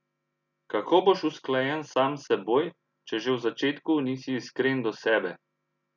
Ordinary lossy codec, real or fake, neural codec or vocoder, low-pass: none; real; none; 7.2 kHz